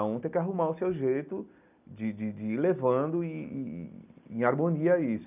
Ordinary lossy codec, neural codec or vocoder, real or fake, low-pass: none; none; real; 3.6 kHz